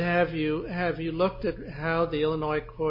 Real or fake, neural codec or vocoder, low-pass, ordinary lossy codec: real; none; 5.4 kHz; MP3, 24 kbps